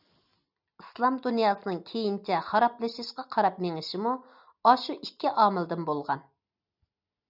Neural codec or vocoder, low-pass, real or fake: none; 5.4 kHz; real